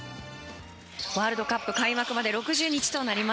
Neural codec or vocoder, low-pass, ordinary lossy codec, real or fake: none; none; none; real